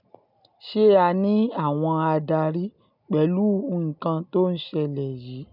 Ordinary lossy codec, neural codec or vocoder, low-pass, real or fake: none; none; 5.4 kHz; real